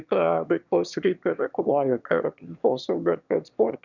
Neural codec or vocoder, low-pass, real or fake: autoencoder, 22.05 kHz, a latent of 192 numbers a frame, VITS, trained on one speaker; 7.2 kHz; fake